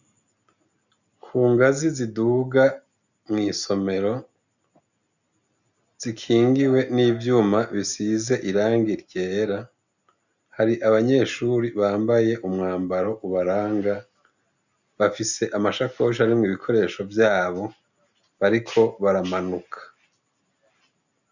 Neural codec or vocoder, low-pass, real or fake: none; 7.2 kHz; real